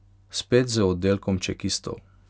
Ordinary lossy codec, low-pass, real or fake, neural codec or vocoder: none; none; real; none